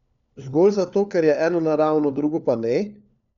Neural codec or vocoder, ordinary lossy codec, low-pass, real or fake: codec, 16 kHz, 4 kbps, FunCodec, trained on LibriTTS, 50 frames a second; none; 7.2 kHz; fake